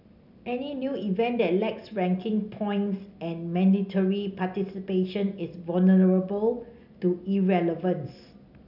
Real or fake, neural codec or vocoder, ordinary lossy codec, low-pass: real; none; none; 5.4 kHz